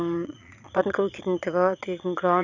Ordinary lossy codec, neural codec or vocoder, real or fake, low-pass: none; vocoder, 22.05 kHz, 80 mel bands, Vocos; fake; 7.2 kHz